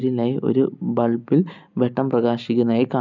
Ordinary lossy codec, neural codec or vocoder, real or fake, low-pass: none; none; real; 7.2 kHz